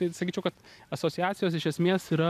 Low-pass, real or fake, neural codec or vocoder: 14.4 kHz; real; none